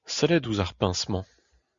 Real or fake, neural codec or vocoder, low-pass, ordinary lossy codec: real; none; 7.2 kHz; Opus, 64 kbps